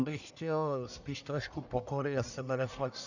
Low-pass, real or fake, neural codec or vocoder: 7.2 kHz; fake; codec, 44.1 kHz, 1.7 kbps, Pupu-Codec